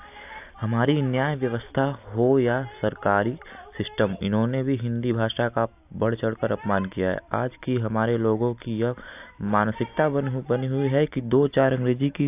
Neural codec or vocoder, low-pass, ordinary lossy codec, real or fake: none; 3.6 kHz; AAC, 32 kbps; real